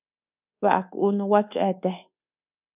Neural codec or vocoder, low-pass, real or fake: codec, 24 kHz, 1.2 kbps, DualCodec; 3.6 kHz; fake